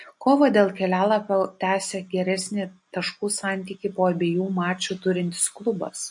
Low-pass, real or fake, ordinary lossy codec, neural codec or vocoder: 10.8 kHz; real; MP3, 48 kbps; none